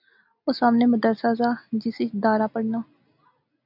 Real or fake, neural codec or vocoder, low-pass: real; none; 5.4 kHz